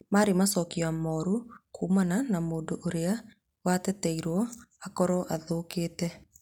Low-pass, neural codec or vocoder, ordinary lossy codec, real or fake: 19.8 kHz; none; none; real